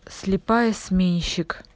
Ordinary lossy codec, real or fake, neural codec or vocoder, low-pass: none; real; none; none